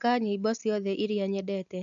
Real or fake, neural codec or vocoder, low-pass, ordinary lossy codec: real; none; 7.2 kHz; AAC, 64 kbps